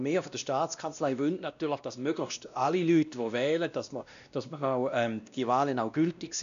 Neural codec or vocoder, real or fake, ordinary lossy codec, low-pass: codec, 16 kHz, 1 kbps, X-Codec, WavLM features, trained on Multilingual LibriSpeech; fake; none; 7.2 kHz